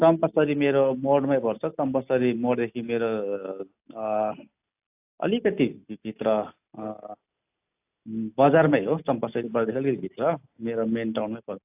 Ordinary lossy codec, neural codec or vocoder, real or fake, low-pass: AAC, 32 kbps; none; real; 3.6 kHz